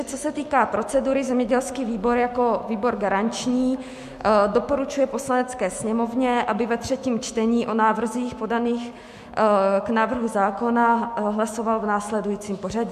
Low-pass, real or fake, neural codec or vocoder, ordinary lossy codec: 14.4 kHz; fake; autoencoder, 48 kHz, 128 numbers a frame, DAC-VAE, trained on Japanese speech; MP3, 64 kbps